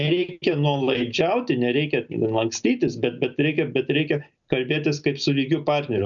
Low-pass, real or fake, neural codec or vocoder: 7.2 kHz; real; none